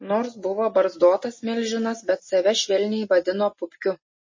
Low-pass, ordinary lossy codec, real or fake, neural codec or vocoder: 7.2 kHz; MP3, 32 kbps; real; none